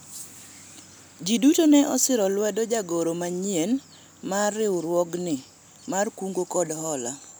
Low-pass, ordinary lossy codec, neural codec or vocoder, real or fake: none; none; none; real